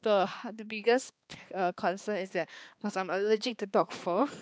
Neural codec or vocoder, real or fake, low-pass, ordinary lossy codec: codec, 16 kHz, 2 kbps, X-Codec, HuBERT features, trained on balanced general audio; fake; none; none